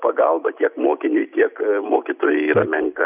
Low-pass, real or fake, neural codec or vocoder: 3.6 kHz; real; none